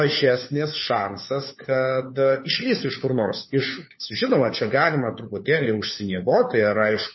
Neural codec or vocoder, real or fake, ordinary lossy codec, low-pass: codec, 16 kHz, 4 kbps, FunCodec, trained on LibriTTS, 50 frames a second; fake; MP3, 24 kbps; 7.2 kHz